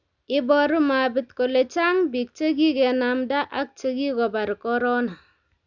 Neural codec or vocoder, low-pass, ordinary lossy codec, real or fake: none; 7.2 kHz; none; real